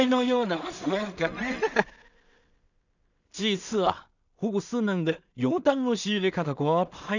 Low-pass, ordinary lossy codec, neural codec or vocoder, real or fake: 7.2 kHz; none; codec, 16 kHz in and 24 kHz out, 0.4 kbps, LongCat-Audio-Codec, two codebook decoder; fake